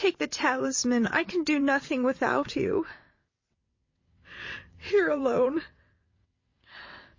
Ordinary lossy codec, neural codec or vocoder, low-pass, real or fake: MP3, 32 kbps; vocoder, 22.05 kHz, 80 mel bands, WaveNeXt; 7.2 kHz; fake